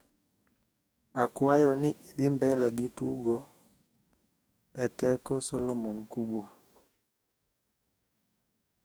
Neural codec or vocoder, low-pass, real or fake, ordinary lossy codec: codec, 44.1 kHz, 2.6 kbps, DAC; none; fake; none